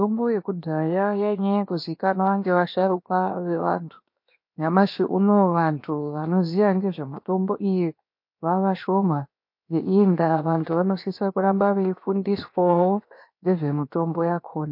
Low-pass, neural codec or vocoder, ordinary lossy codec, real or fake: 5.4 kHz; codec, 16 kHz, 0.7 kbps, FocalCodec; MP3, 32 kbps; fake